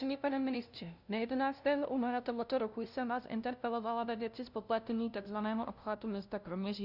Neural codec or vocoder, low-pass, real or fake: codec, 16 kHz, 0.5 kbps, FunCodec, trained on LibriTTS, 25 frames a second; 5.4 kHz; fake